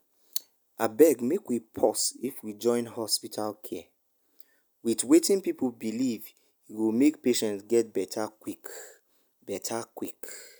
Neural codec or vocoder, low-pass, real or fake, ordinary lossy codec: none; none; real; none